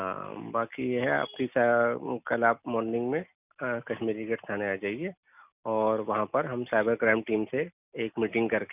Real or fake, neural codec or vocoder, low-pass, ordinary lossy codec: real; none; 3.6 kHz; none